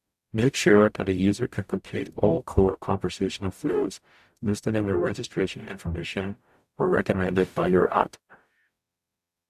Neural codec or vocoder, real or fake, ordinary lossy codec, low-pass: codec, 44.1 kHz, 0.9 kbps, DAC; fake; AAC, 96 kbps; 14.4 kHz